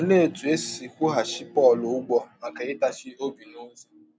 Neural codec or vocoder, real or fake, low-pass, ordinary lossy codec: none; real; none; none